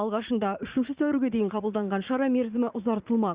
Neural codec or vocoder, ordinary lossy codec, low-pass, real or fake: vocoder, 44.1 kHz, 80 mel bands, Vocos; Opus, 64 kbps; 3.6 kHz; fake